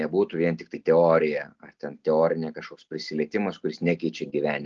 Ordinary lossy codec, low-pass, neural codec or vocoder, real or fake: Opus, 24 kbps; 7.2 kHz; none; real